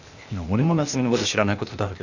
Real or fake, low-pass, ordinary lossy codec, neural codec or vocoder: fake; 7.2 kHz; none; codec, 16 kHz, 0.8 kbps, ZipCodec